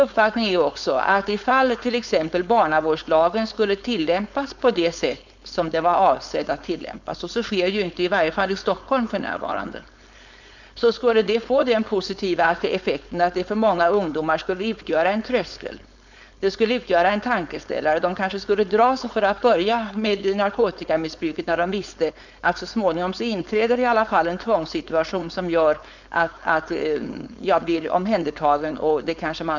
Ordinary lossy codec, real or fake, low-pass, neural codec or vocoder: none; fake; 7.2 kHz; codec, 16 kHz, 4.8 kbps, FACodec